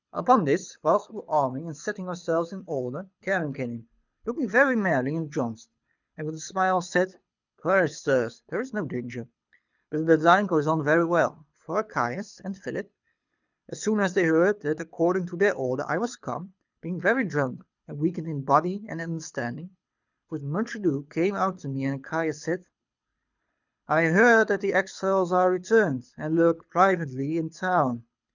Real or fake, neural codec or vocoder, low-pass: fake; codec, 24 kHz, 6 kbps, HILCodec; 7.2 kHz